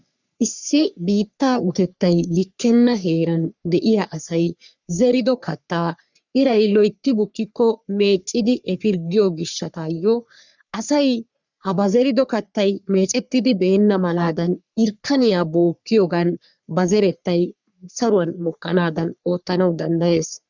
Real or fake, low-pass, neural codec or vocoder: fake; 7.2 kHz; codec, 44.1 kHz, 3.4 kbps, Pupu-Codec